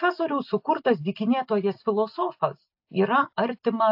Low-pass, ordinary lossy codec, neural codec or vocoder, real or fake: 5.4 kHz; AAC, 48 kbps; none; real